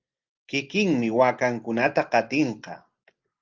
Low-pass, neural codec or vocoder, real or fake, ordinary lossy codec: 7.2 kHz; none; real; Opus, 32 kbps